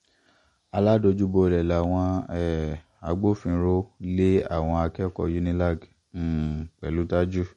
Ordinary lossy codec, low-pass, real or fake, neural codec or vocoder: MP3, 48 kbps; 19.8 kHz; real; none